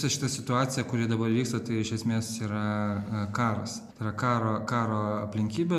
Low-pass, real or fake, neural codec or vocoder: 14.4 kHz; real; none